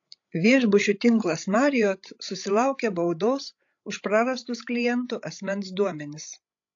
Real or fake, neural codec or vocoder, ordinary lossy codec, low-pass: fake; codec, 16 kHz, 16 kbps, FreqCodec, larger model; AAC, 48 kbps; 7.2 kHz